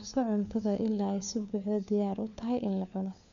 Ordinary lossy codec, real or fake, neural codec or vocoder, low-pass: none; fake; codec, 16 kHz, 4 kbps, FunCodec, trained on LibriTTS, 50 frames a second; 7.2 kHz